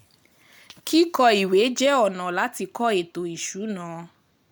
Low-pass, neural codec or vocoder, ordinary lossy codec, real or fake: none; none; none; real